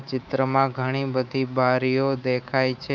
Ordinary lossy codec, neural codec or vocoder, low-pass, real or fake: none; codec, 24 kHz, 3.1 kbps, DualCodec; 7.2 kHz; fake